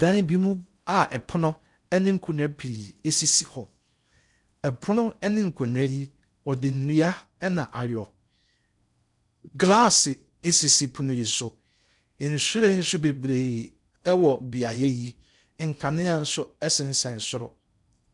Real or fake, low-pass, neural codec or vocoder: fake; 10.8 kHz; codec, 16 kHz in and 24 kHz out, 0.6 kbps, FocalCodec, streaming, 4096 codes